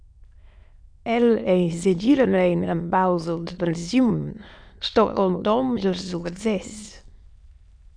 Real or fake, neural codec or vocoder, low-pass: fake; autoencoder, 22.05 kHz, a latent of 192 numbers a frame, VITS, trained on many speakers; 9.9 kHz